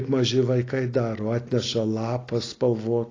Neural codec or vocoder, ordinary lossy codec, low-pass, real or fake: none; AAC, 32 kbps; 7.2 kHz; real